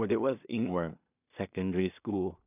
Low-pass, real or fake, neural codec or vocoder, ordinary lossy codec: 3.6 kHz; fake; codec, 16 kHz in and 24 kHz out, 0.4 kbps, LongCat-Audio-Codec, two codebook decoder; none